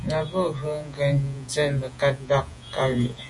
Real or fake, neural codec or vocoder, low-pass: fake; vocoder, 48 kHz, 128 mel bands, Vocos; 10.8 kHz